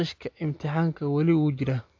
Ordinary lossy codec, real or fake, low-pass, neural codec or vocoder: AAC, 48 kbps; real; 7.2 kHz; none